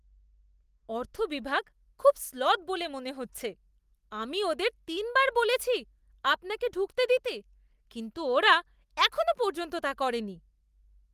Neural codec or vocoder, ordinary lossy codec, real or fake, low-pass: none; Opus, 24 kbps; real; 14.4 kHz